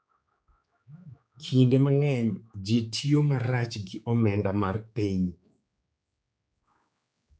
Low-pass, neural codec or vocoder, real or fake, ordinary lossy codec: none; codec, 16 kHz, 4 kbps, X-Codec, HuBERT features, trained on general audio; fake; none